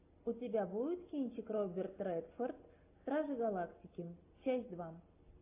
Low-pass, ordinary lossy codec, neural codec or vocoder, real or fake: 3.6 kHz; AAC, 32 kbps; none; real